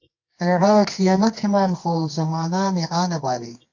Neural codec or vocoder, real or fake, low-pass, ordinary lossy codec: codec, 24 kHz, 0.9 kbps, WavTokenizer, medium music audio release; fake; 7.2 kHz; AAC, 48 kbps